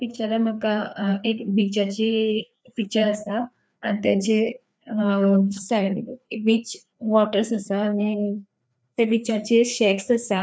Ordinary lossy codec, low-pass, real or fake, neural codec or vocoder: none; none; fake; codec, 16 kHz, 2 kbps, FreqCodec, larger model